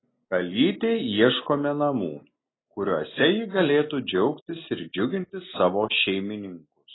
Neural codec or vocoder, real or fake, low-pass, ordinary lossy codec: none; real; 7.2 kHz; AAC, 16 kbps